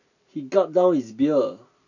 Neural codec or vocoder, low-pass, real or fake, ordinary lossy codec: none; 7.2 kHz; real; none